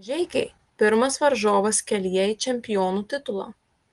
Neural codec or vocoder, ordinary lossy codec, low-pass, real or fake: none; Opus, 24 kbps; 10.8 kHz; real